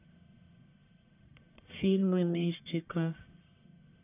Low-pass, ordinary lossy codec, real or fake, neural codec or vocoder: 3.6 kHz; none; fake; codec, 44.1 kHz, 1.7 kbps, Pupu-Codec